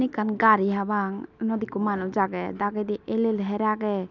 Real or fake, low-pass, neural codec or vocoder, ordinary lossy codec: real; 7.2 kHz; none; none